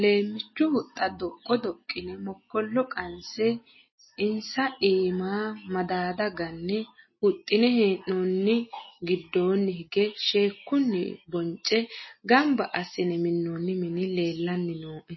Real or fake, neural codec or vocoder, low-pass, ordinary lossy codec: real; none; 7.2 kHz; MP3, 24 kbps